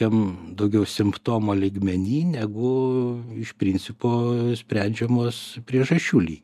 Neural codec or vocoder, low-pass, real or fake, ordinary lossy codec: none; 14.4 kHz; real; MP3, 96 kbps